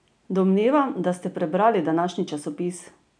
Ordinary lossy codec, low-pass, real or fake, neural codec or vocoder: none; 9.9 kHz; real; none